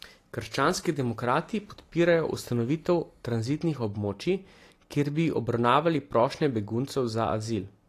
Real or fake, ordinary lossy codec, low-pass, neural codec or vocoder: real; AAC, 48 kbps; 14.4 kHz; none